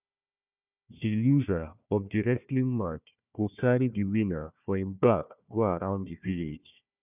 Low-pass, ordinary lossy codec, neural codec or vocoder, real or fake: 3.6 kHz; none; codec, 16 kHz, 1 kbps, FunCodec, trained on Chinese and English, 50 frames a second; fake